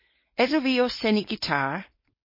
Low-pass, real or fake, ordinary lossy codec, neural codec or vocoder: 5.4 kHz; fake; MP3, 24 kbps; codec, 16 kHz, 4.8 kbps, FACodec